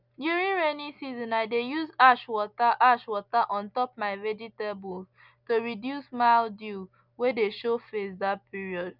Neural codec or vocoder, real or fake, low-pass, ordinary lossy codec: none; real; 5.4 kHz; none